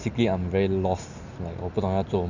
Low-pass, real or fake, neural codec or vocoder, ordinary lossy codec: 7.2 kHz; real; none; none